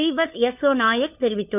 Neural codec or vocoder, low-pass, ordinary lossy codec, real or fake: codec, 16 kHz, 4 kbps, FunCodec, trained on LibriTTS, 50 frames a second; 3.6 kHz; none; fake